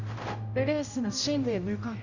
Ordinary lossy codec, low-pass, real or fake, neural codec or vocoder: none; 7.2 kHz; fake; codec, 16 kHz, 0.5 kbps, X-Codec, HuBERT features, trained on general audio